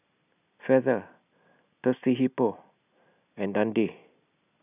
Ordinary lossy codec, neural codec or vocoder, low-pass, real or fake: none; none; 3.6 kHz; real